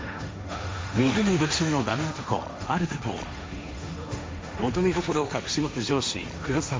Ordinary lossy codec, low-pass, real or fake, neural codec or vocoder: none; none; fake; codec, 16 kHz, 1.1 kbps, Voila-Tokenizer